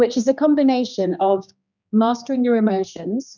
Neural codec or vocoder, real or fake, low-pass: codec, 16 kHz, 2 kbps, X-Codec, HuBERT features, trained on general audio; fake; 7.2 kHz